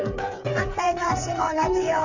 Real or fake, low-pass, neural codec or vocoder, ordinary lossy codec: fake; 7.2 kHz; codec, 16 kHz, 4 kbps, FreqCodec, smaller model; none